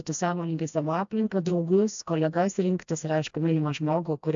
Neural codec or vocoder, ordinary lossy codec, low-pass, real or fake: codec, 16 kHz, 1 kbps, FreqCodec, smaller model; AAC, 64 kbps; 7.2 kHz; fake